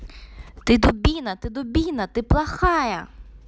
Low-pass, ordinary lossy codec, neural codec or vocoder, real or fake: none; none; none; real